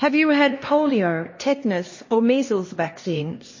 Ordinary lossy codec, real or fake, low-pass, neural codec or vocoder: MP3, 32 kbps; fake; 7.2 kHz; codec, 16 kHz, 2 kbps, X-Codec, HuBERT features, trained on LibriSpeech